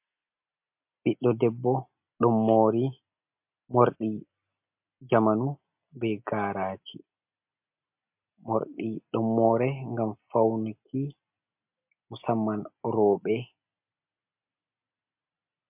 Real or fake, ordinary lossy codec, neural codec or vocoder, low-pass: real; MP3, 32 kbps; none; 3.6 kHz